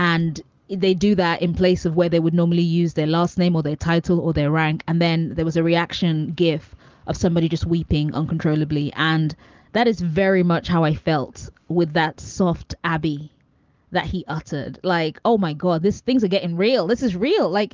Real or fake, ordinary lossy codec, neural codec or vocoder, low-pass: real; Opus, 32 kbps; none; 7.2 kHz